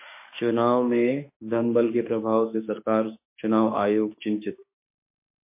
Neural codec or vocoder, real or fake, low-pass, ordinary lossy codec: autoencoder, 48 kHz, 32 numbers a frame, DAC-VAE, trained on Japanese speech; fake; 3.6 kHz; MP3, 24 kbps